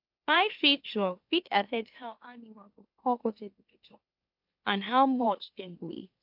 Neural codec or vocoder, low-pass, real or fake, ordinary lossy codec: autoencoder, 44.1 kHz, a latent of 192 numbers a frame, MeloTTS; 5.4 kHz; fake; AAC, 48 kbps